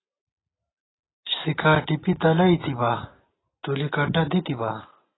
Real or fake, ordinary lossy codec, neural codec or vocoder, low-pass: real; AAC, 16 kbps; none; 7.2 kHz